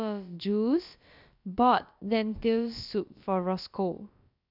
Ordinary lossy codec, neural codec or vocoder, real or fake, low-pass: none; codec, 16 kHz, about 1 kbps, DyCAST, with the encoder's durations; fake; 5.4 kHz